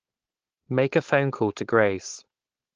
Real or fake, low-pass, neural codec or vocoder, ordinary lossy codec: fake; 7.2 kHz; codec, 16 kHz, 4.8 kbps, FACodec; Opus, 16 kbps